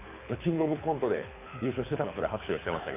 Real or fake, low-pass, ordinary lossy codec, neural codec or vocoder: fake; 3.6 kHz; AAC, 24 kbps; codec, 24 kHz, 6 kbps, HILCodec